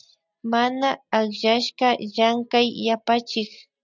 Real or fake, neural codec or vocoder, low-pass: real; none; 7.2 kHz